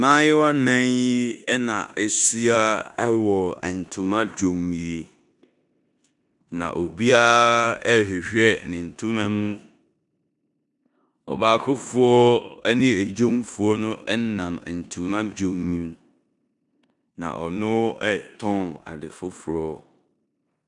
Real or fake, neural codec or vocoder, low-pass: fake; codec, 16 kHz in and 24 kHz out, 0.9 kbps, LongCat-Audio-Codec, four codebook decoder; 10.8 kHz